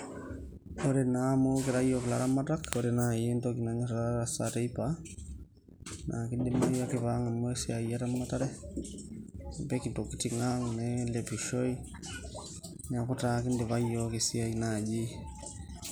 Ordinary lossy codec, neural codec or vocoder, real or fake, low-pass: none; none; real; none